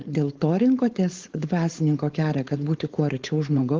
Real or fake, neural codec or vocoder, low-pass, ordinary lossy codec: fake; codec, 16 kHz, 4.8 kbps, FACodec; 7.2 kHz; Opus, 16 kbps